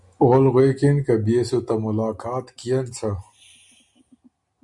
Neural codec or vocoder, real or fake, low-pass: none; real; 10.8 kHz